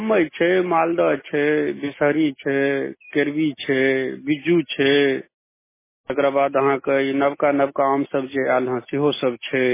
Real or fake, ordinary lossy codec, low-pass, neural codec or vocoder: real; MP3, 16 kbps; 3.6 kHz; none